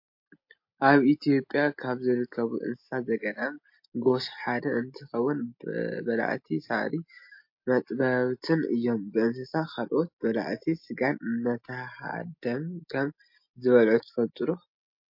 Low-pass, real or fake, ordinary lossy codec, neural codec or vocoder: 5.4 kHz; real; MP3, 32 kbps; none